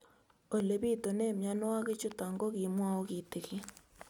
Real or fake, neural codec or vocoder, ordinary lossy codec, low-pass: real; none; none; 19.8 kHz